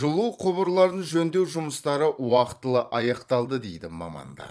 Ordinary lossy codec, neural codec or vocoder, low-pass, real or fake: none; vocoder, 22.05 kHz, 80 mel bands, Vocos; none; fake